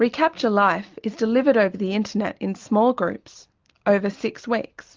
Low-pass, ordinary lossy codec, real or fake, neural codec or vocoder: 7.2 kHz; Opus, 24 kbps; real; none